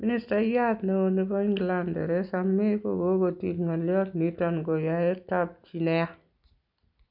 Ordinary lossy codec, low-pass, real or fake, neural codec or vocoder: none; 5.4 kHz; real; none